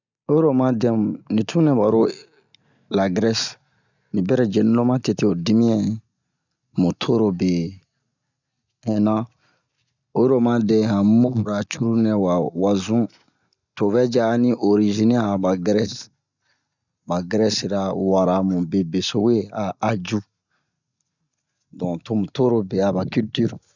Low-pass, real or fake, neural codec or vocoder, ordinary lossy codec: 7.2 kHz; real; none; none